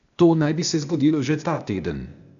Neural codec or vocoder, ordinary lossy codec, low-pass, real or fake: codec, 16 kHz, 0.8 kbps, ZipCodec; none; 7.2 kHz; fake